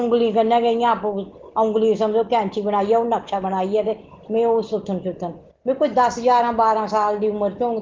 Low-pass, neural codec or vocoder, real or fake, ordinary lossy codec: 7.2 kHz; none; real; Opus, 16 kbps